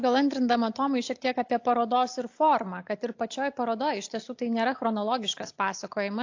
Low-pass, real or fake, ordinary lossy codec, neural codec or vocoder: 7.2 kHz; real; AAC, 48 kbps; none